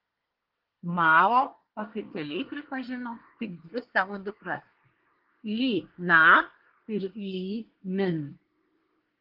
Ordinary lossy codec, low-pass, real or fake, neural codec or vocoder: Opus, 16 kbps; 5.4 kHz; fake; codec, 24 kHz, 1 kbps, SNAC